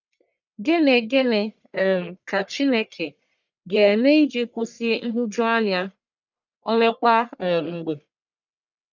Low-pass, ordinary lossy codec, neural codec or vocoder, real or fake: 7.2 kHz; none; codec, 44.1 kHz, 1.7 kbps, Pupu-Codec; fake